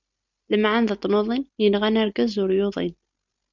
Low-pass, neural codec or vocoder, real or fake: 7.2 kHz; none; real